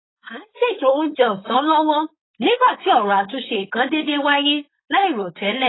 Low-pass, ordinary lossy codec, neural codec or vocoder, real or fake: 7.2 kHz; AAC, 16 kbps; codec, 16 kHz, 4.8 kbps, FACodec; fake